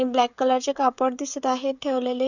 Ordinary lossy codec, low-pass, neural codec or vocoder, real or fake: none; 7.2 kHz; codec, 16 kHz, 16 kbps, FreqCodec, smaller model; fake